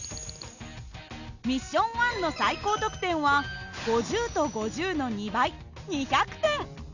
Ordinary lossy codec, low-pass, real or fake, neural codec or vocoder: none; 7.2 kHz; real; none